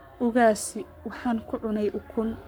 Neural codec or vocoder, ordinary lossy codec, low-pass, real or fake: codec, 44.1 kHz, 7.8 kbps, Pupu-Codec; none; none; fake